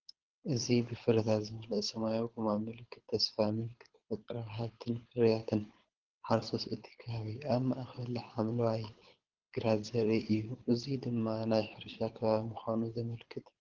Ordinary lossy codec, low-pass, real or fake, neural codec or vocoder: Opus, 16 kbps; 7.2 kHz; fake; codec, 24 kHz, 6 kbps, HILCodec